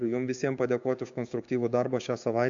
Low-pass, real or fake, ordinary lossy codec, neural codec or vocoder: 7.2 kHz; fake; AAC, 64 kbps; codec, 16 kHz, 6 kbps, DAC